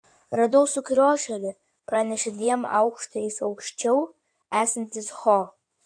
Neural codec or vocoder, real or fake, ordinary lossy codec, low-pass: codec, 16 kHz in and 24 kHz out, 2.2 kbps, FireRedTTS-2 codec; fake; AAC, 64 kbps; 9.9 kHz